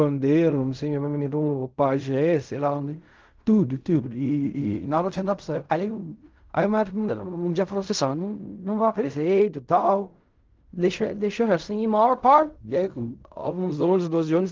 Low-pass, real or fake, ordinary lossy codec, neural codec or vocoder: 7.2 kHz; fake; Opus, 24 kbps; codec, 16 kHz in and 24 kHz out, 0.4 kbps, LongCat-Audio-Codec, fine tuned four codebook decoder